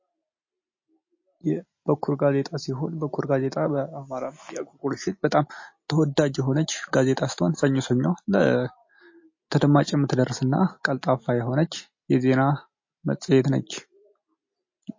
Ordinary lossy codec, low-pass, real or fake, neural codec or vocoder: MP3, 32 kbps; 7.2 kHz; real; none